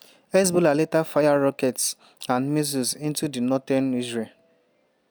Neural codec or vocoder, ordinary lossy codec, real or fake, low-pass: none; none; real; none